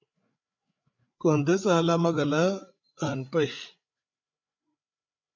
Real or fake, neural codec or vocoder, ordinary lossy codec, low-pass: fake; codec, 16 kHz, 8 kbps, FreqCodec, larger model; MP3, 48 kbps; 7.2 kHz